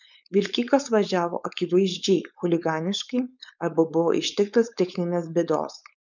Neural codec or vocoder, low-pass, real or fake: codec, 16 kHz, 4.8 kbps, FACodec; 7.2 kHz; fake